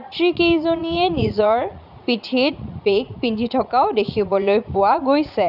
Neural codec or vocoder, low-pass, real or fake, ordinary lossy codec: vocoder, 44.1 kHz, 80 mel bands, Vocos; 5.4 kHz; fake; none